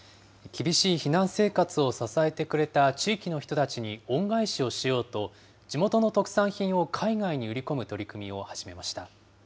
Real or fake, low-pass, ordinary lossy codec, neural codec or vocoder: real; none; none; none